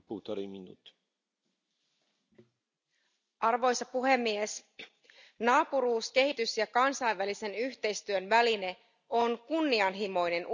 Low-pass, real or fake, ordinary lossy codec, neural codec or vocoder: 7.2 kHz; real; none; none